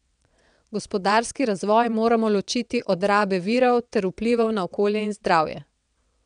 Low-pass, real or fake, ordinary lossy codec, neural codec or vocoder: 9.9 kHz; fake; none; vocoder, 22.05 kHz, 80 mel bands, WaveNeXt